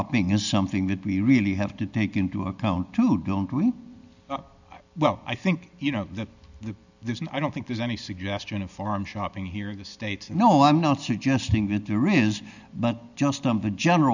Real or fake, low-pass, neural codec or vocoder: real; 7.2 kHz; none